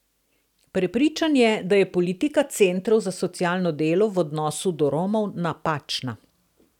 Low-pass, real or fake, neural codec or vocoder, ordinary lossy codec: 19.8 kHz; real; none; none